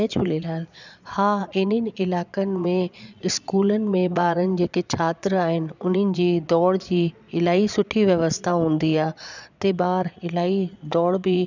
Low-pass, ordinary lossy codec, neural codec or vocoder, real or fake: 7.2 kHz; none; vocoder, 22.05 kHz, 80 mel bands, Vocos; fake